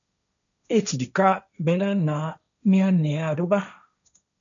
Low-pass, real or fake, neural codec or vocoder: 7.2 kHz; fake; codec, 16 kHz, 1.1 kbps, Voila-Tokenizer